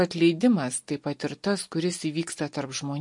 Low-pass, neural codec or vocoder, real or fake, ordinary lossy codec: 10.8 kHz; none; real; MP3, 48 kbps